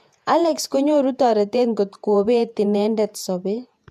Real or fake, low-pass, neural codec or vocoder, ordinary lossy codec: fake; 14.4 kHz; vocoder, 48 kHz, 128 mel bands, Vocos; MP3, 96 kbps